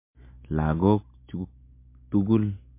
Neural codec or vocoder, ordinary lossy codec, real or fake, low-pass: none; MP3, 24 kbps; real; 3.6 kHz